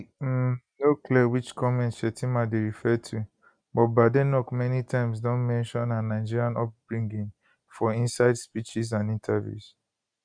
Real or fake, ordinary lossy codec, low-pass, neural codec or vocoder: real; none; 9.9 kHz; none